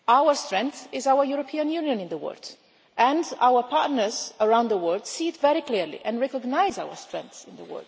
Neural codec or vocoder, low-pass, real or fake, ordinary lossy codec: none; none; real; none